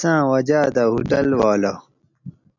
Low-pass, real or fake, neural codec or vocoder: 7.2 kHz; real; none